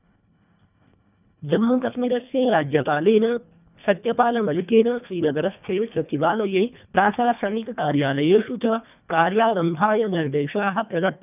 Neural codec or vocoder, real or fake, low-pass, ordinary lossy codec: codec, 24 kHz, 1.5 kbps, HILCodec; fake; 3.6 kHz; none